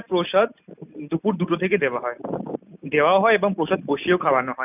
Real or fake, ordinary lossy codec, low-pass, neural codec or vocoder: real; none; 3.6 kHz; none